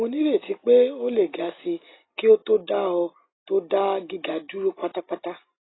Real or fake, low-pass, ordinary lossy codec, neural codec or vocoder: real; 7.2 kHz; AAC, 16 kbps; none